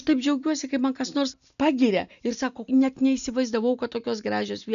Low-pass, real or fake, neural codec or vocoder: 7.2 kHz; real; none